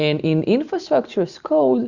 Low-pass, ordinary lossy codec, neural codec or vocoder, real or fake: 7.2 kHz; Opus, 64 kbps; none; real